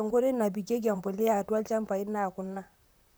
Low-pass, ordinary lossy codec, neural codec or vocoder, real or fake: none; none; vocoder, 44.1 kHz, 128 mel bands, Pupu-Vocoder; fake